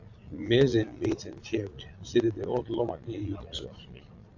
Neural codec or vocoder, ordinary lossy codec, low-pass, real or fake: vocoder, 22.05 kHz, 80 mel bands, Vocos; AAC, 48 kbps; 7.2 kHz; fake